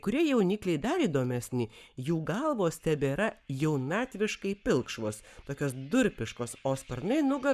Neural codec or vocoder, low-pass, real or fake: codec, 44.1 kHz, 7.8 kbps, Pupu-Codec; 14.4 kHz; fake